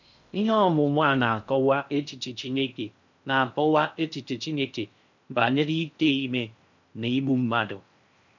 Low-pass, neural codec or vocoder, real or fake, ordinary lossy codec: 7.2 kHz; codec, 16 kHz in and 24 kHz out, 0.6 kbps, FocalCodec, streaming, 4096 codes; fake; none